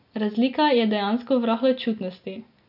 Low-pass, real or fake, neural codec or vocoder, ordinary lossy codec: 5.4 kHz; real; none; none